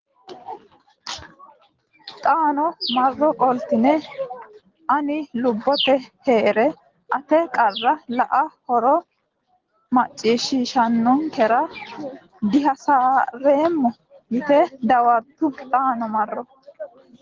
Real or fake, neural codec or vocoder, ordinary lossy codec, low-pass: real; none; Opus, 16 kbps; 7.2 kHz